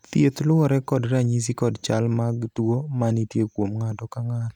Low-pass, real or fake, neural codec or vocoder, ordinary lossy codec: 19.8 kHz; real; none; none